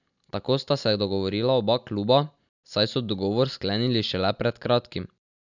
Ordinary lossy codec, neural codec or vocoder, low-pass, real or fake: none; none; 7.2 kHz; real